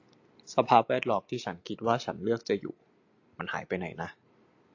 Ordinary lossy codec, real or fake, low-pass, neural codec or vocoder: AAC, 48 kbps; real; 7.2 kHz; none